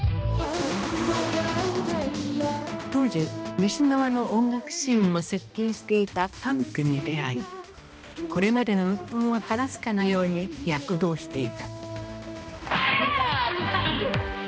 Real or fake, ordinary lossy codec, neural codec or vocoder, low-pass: fake; none; codec, 16 kHz, 1 kbps, X-Codec, HuBERT features, trained on balanced general audio; none